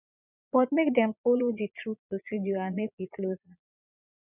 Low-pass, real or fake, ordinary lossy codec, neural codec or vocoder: 3.6 kHz; fake; none; vocoder, 22.05 kHz, 80 mel bands, Vocos